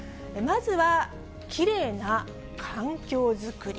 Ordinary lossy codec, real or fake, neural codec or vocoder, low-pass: none; real; none; none